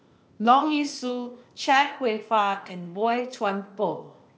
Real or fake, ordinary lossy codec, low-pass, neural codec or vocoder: fake; none; none; codec, 16 kHz, 0.8 kbps, ZipCodec